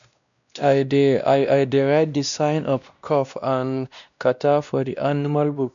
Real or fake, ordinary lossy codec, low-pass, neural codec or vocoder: fake; none; 7.2 kHz; codec, 16 kHz, 1 kbps, X-Codec, WavLM features, trained on Multilingual LibriSpeech